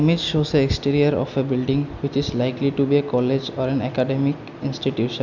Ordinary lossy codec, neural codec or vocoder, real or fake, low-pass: none; none; real; 7.2 kHz